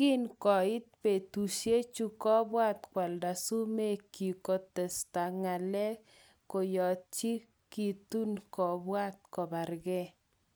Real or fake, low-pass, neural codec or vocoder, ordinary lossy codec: real; none; none; none